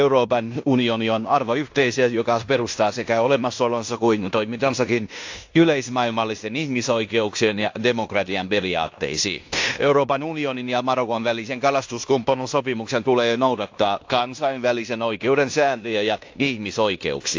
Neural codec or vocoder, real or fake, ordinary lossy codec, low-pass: codec, 16 kHz in and 24 kHz out, 0.9 kbps, LongCat-Audio-Codec, fine tuned four codebook decoder; fake; AAC, 48 kbps; 7.2 kHz